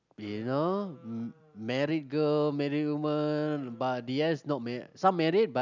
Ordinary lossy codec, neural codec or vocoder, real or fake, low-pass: none; none; real; 7.2 kHz